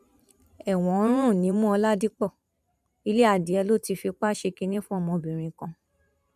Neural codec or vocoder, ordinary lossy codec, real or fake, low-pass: vocoder, 44.1 kHz, 128 mel bands every 512 samples, BigVGAN v2; none; fake; 14.4 kHz